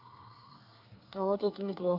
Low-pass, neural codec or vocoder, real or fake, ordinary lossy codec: 5.4 kHz; codec, 24 kHz, 1 kbps, SNAC; fake; AAC, 32 kbps